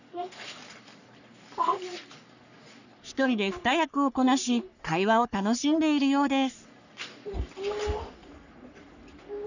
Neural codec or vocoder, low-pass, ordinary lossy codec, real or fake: codec, 44.1 kHz, 3.4 kbps, Pupu-Codec; 7.2 kHz; none; fake